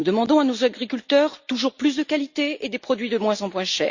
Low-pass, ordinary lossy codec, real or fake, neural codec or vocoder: 7.2 kHz; Opus, 64 kbps; real; none